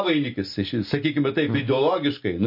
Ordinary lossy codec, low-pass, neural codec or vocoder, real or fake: MP3, 32 kbps; 5.4 kHz; none; real